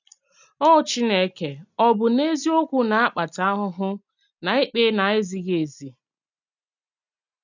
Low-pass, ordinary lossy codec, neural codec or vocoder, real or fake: 7.2 kHz; none; none; real